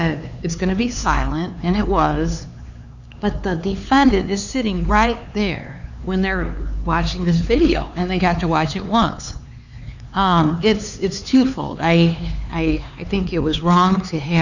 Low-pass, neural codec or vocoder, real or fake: 7.2 kHz; codec, 16 kHz, 4 kbps, X-Codec, HuBERT features, trained on LibriSpeech; fake